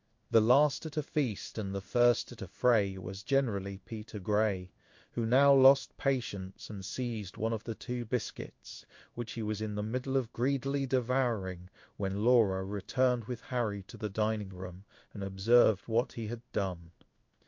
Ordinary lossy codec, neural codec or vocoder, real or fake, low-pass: MP3, 48 kbps; codec, 16 kHz in and 24 kHz out, 1 kbps, XY-Tokenizer; fake; 7.2 kHz